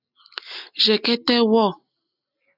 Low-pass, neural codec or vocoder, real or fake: 5.4 kHz; none; real